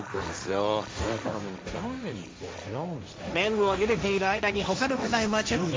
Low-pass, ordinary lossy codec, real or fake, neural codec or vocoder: none; none; fake; codec, 16 kHz, 1.1 kbps, Voila-Tokenizer